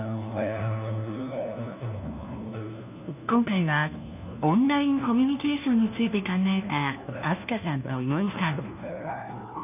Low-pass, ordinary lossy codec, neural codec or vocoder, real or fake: 3.6 kHz; none; codec, 16 kHz, 1 kbps, FunCodec, trained on LibriTTS, 50 frames a second; fake